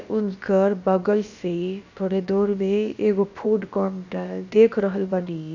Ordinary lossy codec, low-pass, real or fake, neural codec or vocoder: none; 7.2 kHz; fake; codec, 16 kHz, about 1 kbps, DyCAST, with the encoder's durations